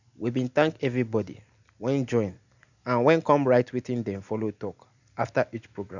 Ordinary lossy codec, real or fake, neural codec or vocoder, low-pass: none; real; none; 7.2 kHz